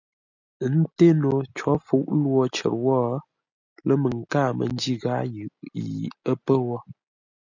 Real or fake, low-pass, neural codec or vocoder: real; 7.2 kHz; none